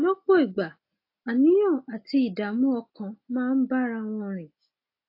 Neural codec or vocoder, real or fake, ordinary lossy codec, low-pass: none; real; AAC, 32 kbps; 5.4 kHz